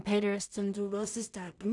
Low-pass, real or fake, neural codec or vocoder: 10.8 kHz; fake; codec, 16 kHz in and 24 kHz out, 0.4 kbps, LongCat-Audio-Codec, two codebook decoder